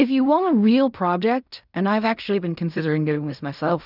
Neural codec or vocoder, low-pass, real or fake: codec, 16 kHz in and 24 kHz out, 0.4 kbps, LongCat-Audio-Codec, fine tuned four codebook decoder; 5.4 kHz; fake